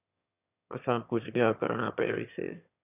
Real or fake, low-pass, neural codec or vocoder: fake; 3.6 kHz; autoencoder, 22.05 kHz, a latent of 192 numbers a frame, VITS, trained on one speaker